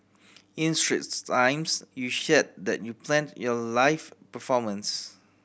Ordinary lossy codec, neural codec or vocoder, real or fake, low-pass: none; none; real; none